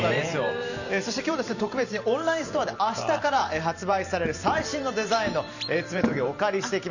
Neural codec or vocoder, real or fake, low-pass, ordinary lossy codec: vocoder, 44.1 kHz, 128 mel bands every 512 samples, BigVGAN v2; fake; 7.2 kHz; none